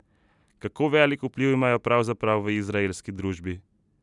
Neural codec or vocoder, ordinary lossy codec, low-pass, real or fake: none; none; 10.8 kHz; real